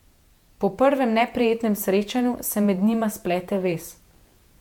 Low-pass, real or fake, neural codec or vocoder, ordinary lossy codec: 19.8 kHz; fake; vocoder, 48 kHz, 128 mel bands, Vocos; MP3, 96 kbps